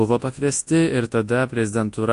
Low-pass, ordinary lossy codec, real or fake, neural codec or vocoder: 10.8 kHz; AAC, 48 kbps; fake; codec, 24 kHz, 0.9 kbps, WavTokenizer, large speech release